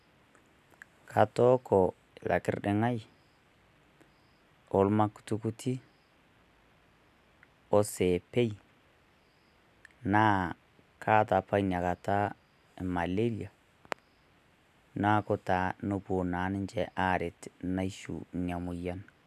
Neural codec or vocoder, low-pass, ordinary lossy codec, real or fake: none; 14.4 kHz; none; real